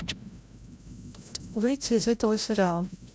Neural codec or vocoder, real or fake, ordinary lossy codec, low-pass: codec, 16 kHz, 0.5 kbps, FreqCodec, larger model; fake; none; none